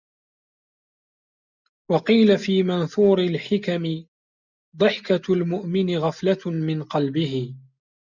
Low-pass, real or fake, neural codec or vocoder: 7.2 kHz; real; none